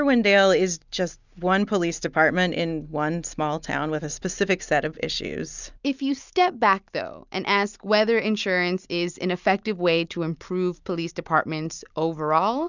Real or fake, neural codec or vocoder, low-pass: real; none; 7.2 kHz